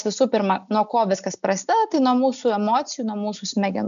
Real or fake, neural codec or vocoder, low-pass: real; none; 7.2 kHz